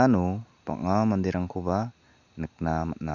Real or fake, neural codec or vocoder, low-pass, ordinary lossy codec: real; none; 7.2 kHz; none